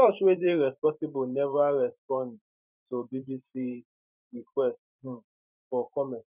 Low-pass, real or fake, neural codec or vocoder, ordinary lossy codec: 3.6 kHz; fake; vocoder, 44.1 kHz, 128 mel bands every 512 samples, BigVGAN v2; none